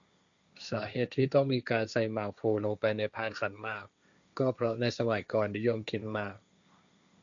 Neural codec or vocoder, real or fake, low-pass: codec, 16 kHz, 1.1 kbps, Voila-Tokenizer; fake; 7.2 kHz